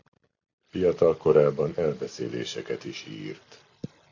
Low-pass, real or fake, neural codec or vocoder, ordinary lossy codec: 7.2 kHz; real; none; AAC, 48 kbps